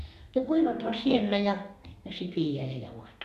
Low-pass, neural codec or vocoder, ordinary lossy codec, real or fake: 14.4 kHz; codec, 32 kHz, 1.9 kbps, SNAC; none; fake